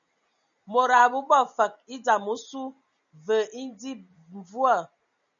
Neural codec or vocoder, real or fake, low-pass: none; real; 7.2 kHz